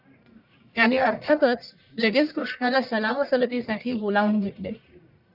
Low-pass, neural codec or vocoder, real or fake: 5.4 kHz; codec, 44.1 kHz, 1.7 kbps, Pupu-Codec; fake